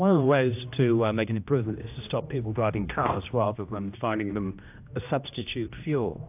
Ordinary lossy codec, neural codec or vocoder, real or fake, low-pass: AAC, 32 kbps; codec, 16 kHz, 1 kbps, X-Codec, HuBERT features, trained on general audio; fake; 3.6 kHz